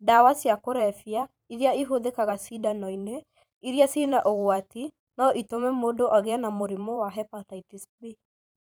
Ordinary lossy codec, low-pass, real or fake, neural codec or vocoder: none; none; fake; vocoder, 44.1 kHz, 128 mel bands every 512 samples, BigVGAN v2